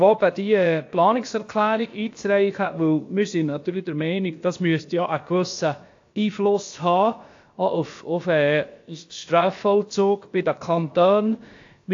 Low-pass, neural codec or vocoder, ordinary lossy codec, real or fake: 7.2 kHz; codec, 16 kHz, about 1 kbps, DyCAST, with the encoder's durations; AAC, 48 kbps; fake